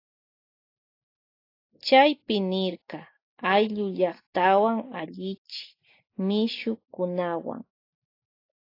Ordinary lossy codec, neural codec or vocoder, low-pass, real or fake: AAC, 32 kbps; none; 5.4 kHz; real